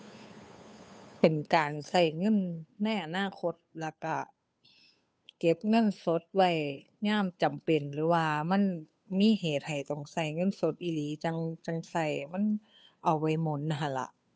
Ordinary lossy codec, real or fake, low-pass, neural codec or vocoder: none; fake; none; codec, 16 kHz, 2 kbps, FunCodec, trained on Chinese and English, 25 frames a second